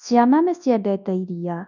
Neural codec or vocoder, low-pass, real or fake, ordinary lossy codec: codec, 24 kHz, 0.9 kbps, WavTokenizer, large speech release; 7.2 kHz; fake; none